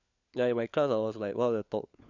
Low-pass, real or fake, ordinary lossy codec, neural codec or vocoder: 7.2 kHz; fake; none; codec, 16 kHz, 4 kbps, FunCodec, trained on LibriTTS, 50 frames a second